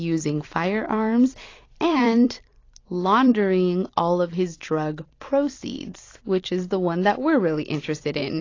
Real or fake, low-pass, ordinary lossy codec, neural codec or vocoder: fake; 7.2 kHz; AAC, 32 kbps; vocoder, 44.1 kHz, 128 mel bands every 256 samples, BigVGAN v2